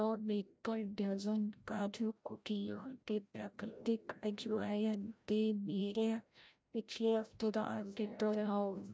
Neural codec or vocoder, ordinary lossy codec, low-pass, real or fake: codec, 16 kHz, 0.5 kbps, FreqCodec, larger model; none; none; fake